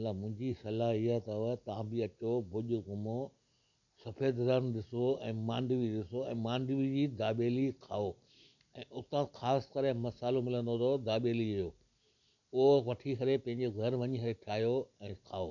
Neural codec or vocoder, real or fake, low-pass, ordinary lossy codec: none; real; 7.2 kHz; none